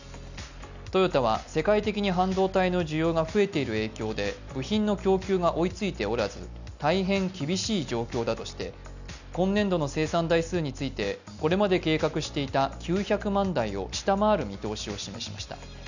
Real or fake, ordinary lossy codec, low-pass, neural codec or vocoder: real; none; 7.2 kHz; none